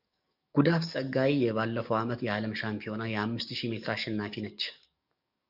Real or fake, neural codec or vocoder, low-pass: fake; codec, 44.1 kHz, 7.8 kbps, DAC; 5.4 kHz